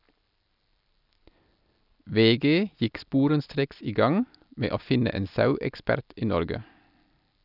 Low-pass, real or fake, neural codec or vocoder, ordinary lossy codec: 5.4 kHz; real; none; none